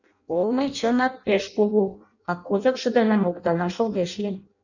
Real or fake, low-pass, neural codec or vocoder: fake; 7.2 kHz; codec, 16 kHz in and 24 kHz out, 0.6 kbps, FireRedTTS-2 codec